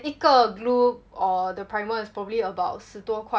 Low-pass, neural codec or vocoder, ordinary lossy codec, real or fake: none; none; none; real